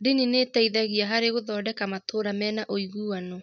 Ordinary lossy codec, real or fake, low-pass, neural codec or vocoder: none; real; 7.2 kHz; none